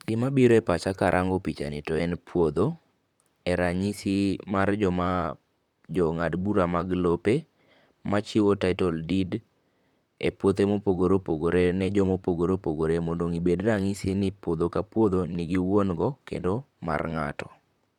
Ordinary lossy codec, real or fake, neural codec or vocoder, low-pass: none; fake; vocoder, 44.1 kHz, 128 mel bands, Pupu-Vocoder; 19.8 kHz